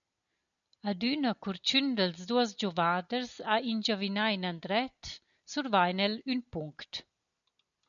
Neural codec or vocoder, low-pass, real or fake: none; 7.2 kHz; real